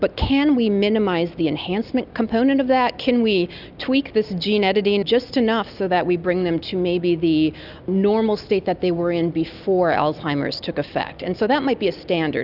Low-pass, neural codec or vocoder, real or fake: 5.4 kHz; none; real